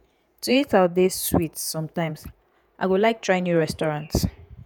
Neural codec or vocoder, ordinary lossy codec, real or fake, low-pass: vocoder, 48 kHz, 128 mel bands, Vocos; none; fake; none